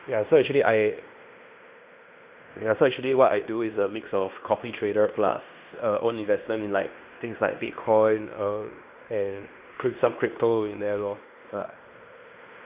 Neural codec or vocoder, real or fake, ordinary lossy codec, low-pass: codec, 16 kHz in and 24 kHz out, 0.9 kbps, LongCat-Audio-Codec, fine tuned four codebook decoder; fake; Opus, 64 kbps; 3.6 kHz